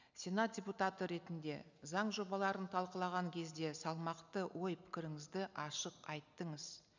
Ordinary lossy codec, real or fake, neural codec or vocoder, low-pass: none; real; none; 7.2 kHz